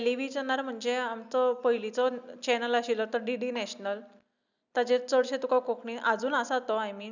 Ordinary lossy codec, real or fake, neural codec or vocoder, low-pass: none; real; none; 7.2 kHz